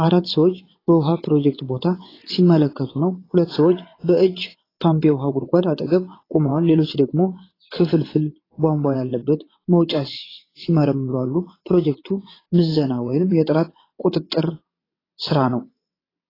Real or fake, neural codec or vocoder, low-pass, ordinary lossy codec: fake; vocoder, 22.05 kHz, 80 mel bands, WaveNeXt; 5.4 kHz; AAC, 24 kbps